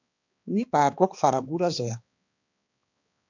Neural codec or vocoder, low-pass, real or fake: codec, 16 kHz, 2 kbps, X-Codec, HuBERT features, trained on balanced general audio; 7.2 kHz; fake